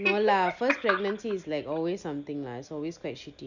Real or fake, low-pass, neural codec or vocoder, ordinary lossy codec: real; 7.2 kHz; none; none